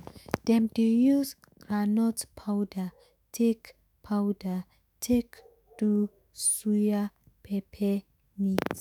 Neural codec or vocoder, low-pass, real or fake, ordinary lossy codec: autoencoder, 48 kHz, 128 numbers a frame, DAC-VAE, trained on Japanese speech; none; fake; none